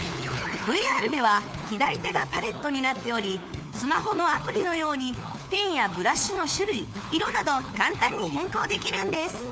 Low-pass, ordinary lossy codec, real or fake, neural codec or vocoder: none; none; fake; codec, 16 kHz, 4 kbps, FunCodec, trained on LibriTTS, 50 frames a second